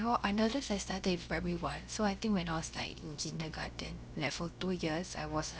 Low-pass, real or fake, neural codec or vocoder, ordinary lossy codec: none; fake; codec, 16 kHz, about 1 kbps, DyCAST, with the encoder's durations; none